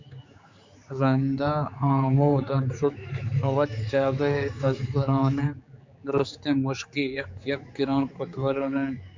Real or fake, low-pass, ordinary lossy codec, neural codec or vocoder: fake; 7.2 kHz; MP3, 64 kbps; codec, 16 kHz, 4 kbps, X-Codec, HuBERT features, trained on general audio